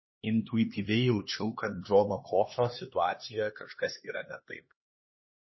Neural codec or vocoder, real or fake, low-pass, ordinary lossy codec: codec, 16 kHz, 2 kbps, X-Codec, HuBERT features, trained on LibriSpeech; fake; 7.2 kHz; MP3, 24 kbps